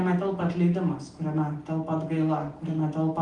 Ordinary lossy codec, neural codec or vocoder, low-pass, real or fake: Opus, 24 kbps; none; 10.8 kHz; real